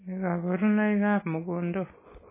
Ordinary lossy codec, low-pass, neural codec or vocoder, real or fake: MP3, 16 kbps; 3.6 kHz; none; real